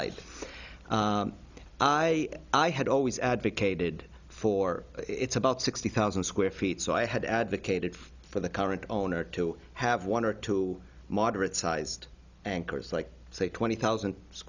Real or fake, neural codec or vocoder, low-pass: real; none; 7.2 kHz